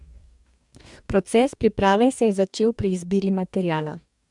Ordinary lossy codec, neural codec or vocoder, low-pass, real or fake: MP3, 96 kbps; codec, 44.1 kHz, 2.6 kbps, DAC; 10.8 kHz; fake